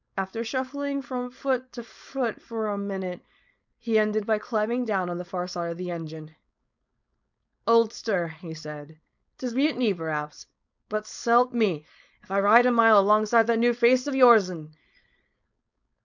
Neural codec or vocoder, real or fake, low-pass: codec, 16 kHz, 4.8 kbps, FACodec; fake; 7.2 kHz